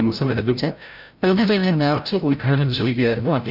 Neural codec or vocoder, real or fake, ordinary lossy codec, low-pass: codec, 16 kHz, 0.5 kbps, FreqCodec, larger model; fake; none; 5.4 kHz